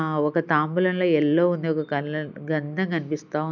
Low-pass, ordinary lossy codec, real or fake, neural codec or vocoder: 7.2 kHz; none; real; none